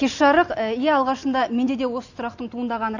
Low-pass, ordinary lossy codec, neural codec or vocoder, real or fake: 7.2 kHz; none; none; real